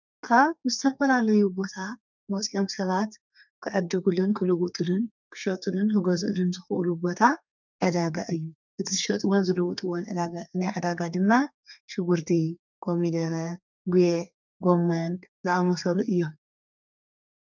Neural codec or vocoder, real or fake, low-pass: codec, 32 kHz, 1.9 kbps, SNAC; fake; 7.2 kHz